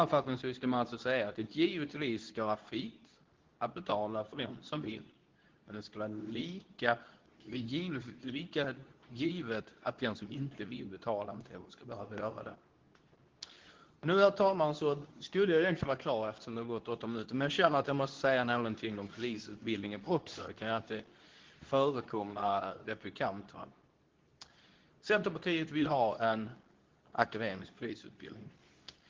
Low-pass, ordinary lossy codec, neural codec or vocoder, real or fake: 7.2 kHz; Opus, 16 kbps; codec, 24 kHz, 0.9 kbps, WavTokenizer, medium speech release version 2; fake